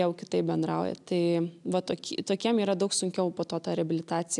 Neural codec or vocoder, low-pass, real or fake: none; 10.8 kHz; real